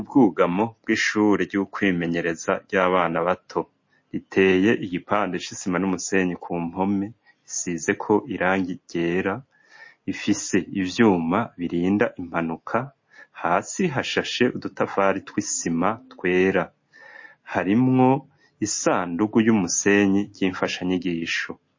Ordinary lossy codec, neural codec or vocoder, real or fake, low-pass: MP3, 32 kbps; none; real; 7.2 kHz